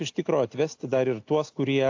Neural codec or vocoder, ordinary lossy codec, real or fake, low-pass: none; AAC, 48 kbps; real; 7.2 kHz